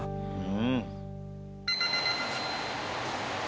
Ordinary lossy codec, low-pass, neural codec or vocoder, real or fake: none; none; none; real